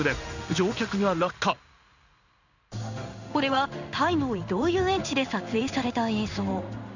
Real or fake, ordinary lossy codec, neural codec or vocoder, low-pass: fake; none; codec, 16 kHz in and 24 kHz out, 1 kbps, XY-Tokenizer; 7.2 kHz